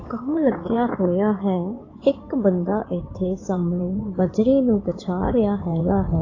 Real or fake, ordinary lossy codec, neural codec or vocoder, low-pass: fake; AAC, 32 kbps; codec, 16 kHz in and 24 kHz out, 2.2 kbps, FireRedTTS-2 codec; 7.2 kHz